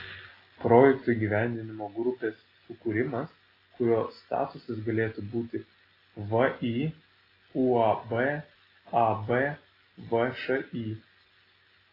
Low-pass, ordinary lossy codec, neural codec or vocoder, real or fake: 5.4 kHz; AAC, 24 kbps; none; real